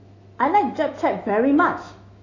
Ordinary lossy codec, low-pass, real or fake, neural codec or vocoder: AAC, 32 kbps; 7.2 kHz; fake; autoencoder, 48 kHz, 128 numbers a frame, DAC-VAE, trained on Japanese speech